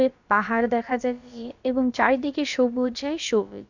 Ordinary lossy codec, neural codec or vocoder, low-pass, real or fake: none; codec, 16 kHz, about 1 kbps, DyCAST, with the encoder's durations; 7.2 kHz; fake